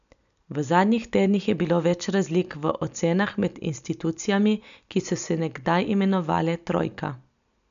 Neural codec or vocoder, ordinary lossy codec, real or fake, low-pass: none; none; real; 7.2 kHz